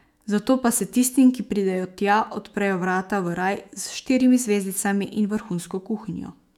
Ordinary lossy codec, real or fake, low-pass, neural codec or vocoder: none; fake; 19.8 kHz; autoencoder, 48 kHz, 128 numbers a frame, DAC-VAE, trained on Japanese speech